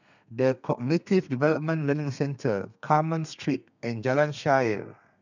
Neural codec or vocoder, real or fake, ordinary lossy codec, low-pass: codec, 32 kHz, 1.9 kbps, SNAC; fake; none; 7.2 kHz